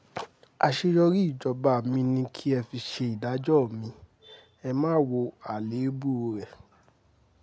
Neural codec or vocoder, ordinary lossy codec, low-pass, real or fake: none; none; none; real